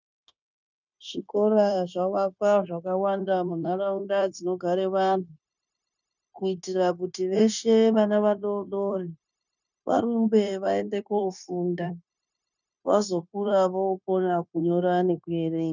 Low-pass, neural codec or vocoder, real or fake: 7.2 kHz; codec, 16 kHz, 0.9 kbps, LongCat-Audio-Codec; fake